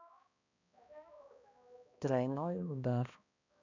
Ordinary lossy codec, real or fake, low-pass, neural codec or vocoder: none; fake; 7.2 kHz; codec, 16 kHz, 1 kbps, X-Codec, HuBERT features, trained on balanced general audio